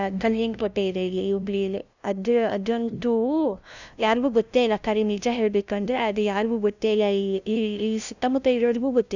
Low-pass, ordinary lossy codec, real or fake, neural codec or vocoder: 7.2 kHz; none; fake; codec, 16 kHz, 0.5 kbps, FunCodec, trained on LibriTTS, 25 frames a second